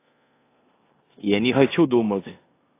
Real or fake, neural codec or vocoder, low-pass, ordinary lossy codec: fake; codec, 16 kHz in and 24 kHz out, 0.9 kbps, LongCat-Audio-Codec, four codebook decoder; 3.6 kHz; AAC, 24 kbps